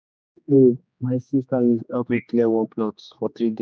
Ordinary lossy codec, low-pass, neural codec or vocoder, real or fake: none; none; codec, 16 kHz, 2 kbps, X-Codec, HuBERT features, trained on general audio; fake